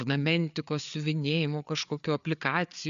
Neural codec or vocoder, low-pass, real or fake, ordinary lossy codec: codec, 16 kHz, 4 kbps, FreqCodec, larger model; 7.2 kHz; fake; AAC, 96 kbps